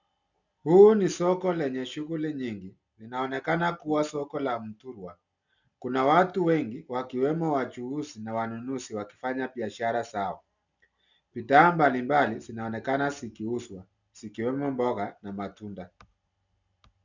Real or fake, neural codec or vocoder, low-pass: real; none; 7.2 kHz